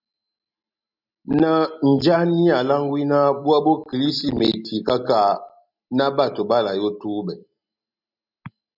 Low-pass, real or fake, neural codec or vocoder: 5.4 kHz; real; none